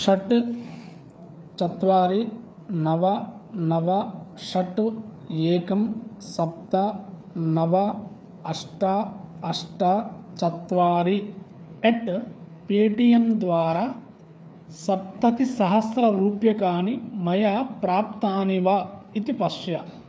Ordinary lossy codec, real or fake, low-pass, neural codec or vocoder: none; fake; none; codec, 16 kHz, 4 kbps, FreqCodec, larger model